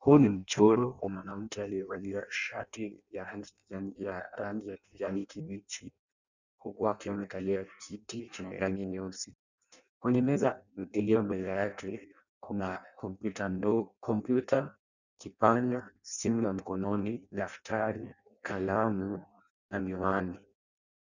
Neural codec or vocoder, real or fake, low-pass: codec, 16 kHz in and 24 kHz out, 0.6 kbps, FireRedTTS-2 codec; fake; 7.2 kHz